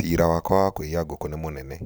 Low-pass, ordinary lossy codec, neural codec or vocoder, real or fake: none; none; none; real